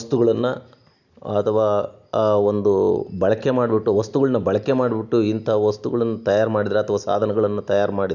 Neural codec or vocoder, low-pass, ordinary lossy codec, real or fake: none; 7.2 kHz; none; real